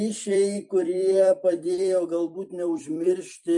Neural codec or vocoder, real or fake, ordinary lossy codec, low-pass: vocoder, 44.1 kHz, 128 mel bands every 256 samples, BigVGAN v2; fake; MP3, 96 kbps; 10.8 kHz